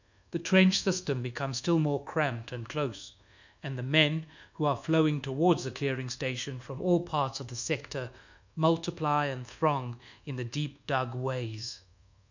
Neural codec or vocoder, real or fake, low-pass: codec, 24 kHz, 1.2 kbps, DualCodec; fake; 7.2 kHz